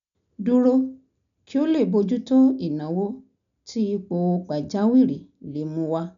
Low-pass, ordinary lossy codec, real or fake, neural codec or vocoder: 7.2 kHz; none; real; none